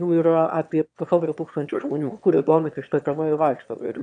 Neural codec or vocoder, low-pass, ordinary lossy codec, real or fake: autoencoder, 22.05 kHz, a latent of 192 numbers a frame, VITS, trained on one speaker; 9.9 kHz; AAC, 64 kbps; fake